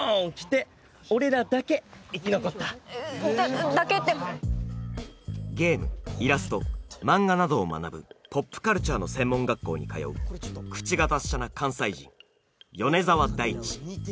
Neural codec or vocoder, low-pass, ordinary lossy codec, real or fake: none; none; none; real